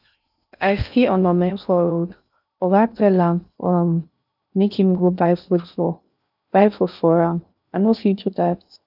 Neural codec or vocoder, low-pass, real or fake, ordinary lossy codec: codec, 16 kHz in and 24 kHz out, 0.6 kbps, FocalCodec, streaming, 2048 codes; 5.4 kHz; fake; none